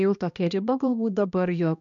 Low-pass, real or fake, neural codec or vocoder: 7.2 kHz; fake; codec, 16 kHz, 1 kbps, X-Codec, HuBERT features, trained on balanced general audio